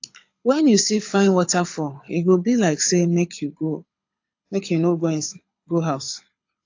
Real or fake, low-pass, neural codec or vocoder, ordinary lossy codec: fake; 7.2 kHz; codec, 24 kHz, 6 kbps, HILCodec; AAC, 48 kbps